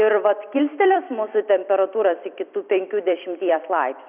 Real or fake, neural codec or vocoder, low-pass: fake; vocoder, 44.1 kHz, 128 mel bands every 256 samples, BigVGAN v2; 3.6 kHz